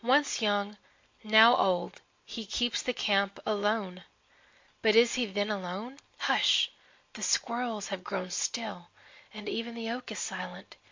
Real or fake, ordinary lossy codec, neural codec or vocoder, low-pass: real; MP3, 48 kbps; none; 7.2 kHz